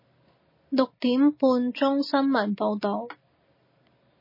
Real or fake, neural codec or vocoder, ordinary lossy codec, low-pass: real; none; MP3, 24 kbps; 5.4 kHz